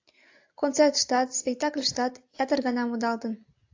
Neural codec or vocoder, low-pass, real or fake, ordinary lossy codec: none; 7.2 kHz; real; AAC, 48 kbps